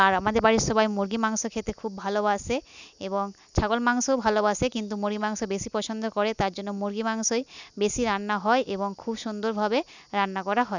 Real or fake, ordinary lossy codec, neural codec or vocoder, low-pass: real; none; none; 7.2 kHz